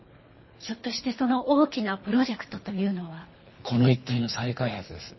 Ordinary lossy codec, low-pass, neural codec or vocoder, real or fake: MP3, 24 kbps; 7.2 kHz; codec, 24 kHz, 3 kbps, HILCodec; fake